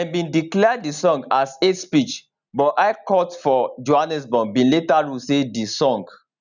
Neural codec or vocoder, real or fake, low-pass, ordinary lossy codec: none; real; 7.2 kHz; none